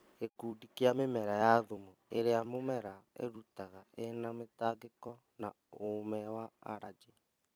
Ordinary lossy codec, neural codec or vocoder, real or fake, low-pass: none; vocoder, 44.1 kHz, 128 mel bands every 256 samples, BigVGAN v2; fake; none